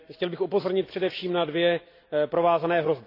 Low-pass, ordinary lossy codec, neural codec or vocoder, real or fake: 5.4 kHz; AAC, 32 kbps; none; real